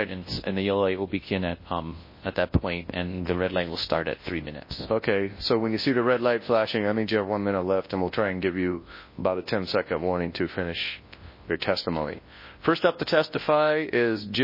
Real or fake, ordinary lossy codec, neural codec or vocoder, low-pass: fake; MP3, 24 kbps; codec, 24 kHz, 0.9 kbps, WavTokenizer, large speech release; 5.4 kHz